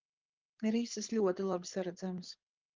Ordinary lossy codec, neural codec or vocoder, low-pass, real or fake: Opus, 24 kbps; codec, 24 kHz, 6 kbps, HILCodec; 7.2 kHz; fake